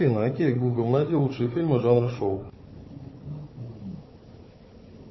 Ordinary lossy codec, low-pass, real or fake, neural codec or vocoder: MP3, 24 kbps; 7.2 kHz; fake; codec, 16 kHz, 4 kbps, FunCodec, trained on Chinese and English, 50 frames a second